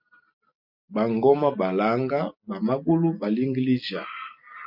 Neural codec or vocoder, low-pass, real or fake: none; 5.4 kHz; real